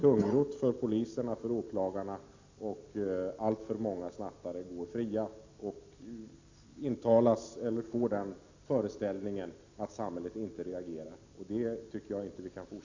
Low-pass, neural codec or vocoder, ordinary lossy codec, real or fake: 7.2 kHz; none; AAC, 48 kbps; real